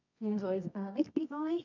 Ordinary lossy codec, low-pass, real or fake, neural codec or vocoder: none; 7.2 kHz; fake; codec, 24 kHz, 0.9 kbps, WavTokenizer, medium music audio release